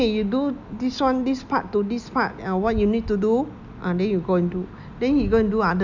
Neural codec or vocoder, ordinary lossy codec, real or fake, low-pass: none; none; real; 7.2 kHz